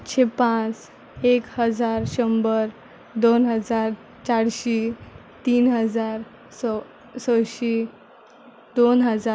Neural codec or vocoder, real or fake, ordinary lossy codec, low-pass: none; real; none; none